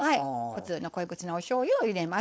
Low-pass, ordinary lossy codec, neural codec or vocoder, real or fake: none; none; codec, 16 kHz, 4.8 kbps, FACodec; fake